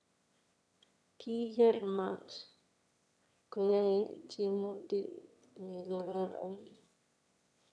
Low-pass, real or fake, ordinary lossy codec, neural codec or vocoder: none; fake; none; autoencoder, 22.05 kHz, a latent of 192 numbers a frame, VITS, trained on one speaker